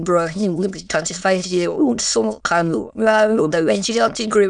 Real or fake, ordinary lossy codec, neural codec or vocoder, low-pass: fake; none; autoencoder, 22.05 kHz, a latent of 192 numbers a frame, VITS, trained on many speakers; 9.9 kHz